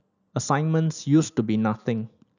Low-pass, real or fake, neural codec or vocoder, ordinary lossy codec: 7.2 kHz; real; none; none